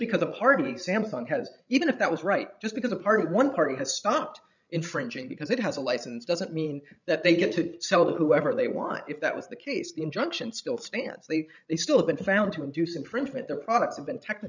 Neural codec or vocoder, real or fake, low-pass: codec, 16 kHz, 16 kbps, FreqCodec, larger model; fake; 7.2 kHz